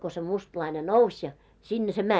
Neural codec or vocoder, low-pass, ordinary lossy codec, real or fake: none; none; none; real